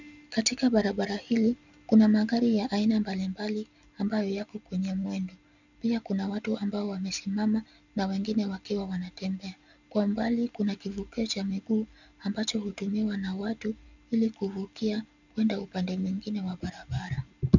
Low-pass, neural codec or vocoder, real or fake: 7.2 kHz; none; real